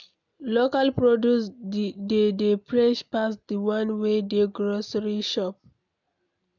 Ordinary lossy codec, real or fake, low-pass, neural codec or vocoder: none; real; 7.2 kHz; none